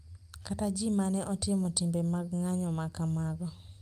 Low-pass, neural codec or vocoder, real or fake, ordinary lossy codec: 14.4 kHz; none; real; Opus, 32 kbps